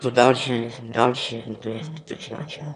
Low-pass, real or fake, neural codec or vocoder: 9.9 kHz; fake; autoencoder, 22.05 kHz, a latent of 192 numbers a frame, VITS, trained on one speaker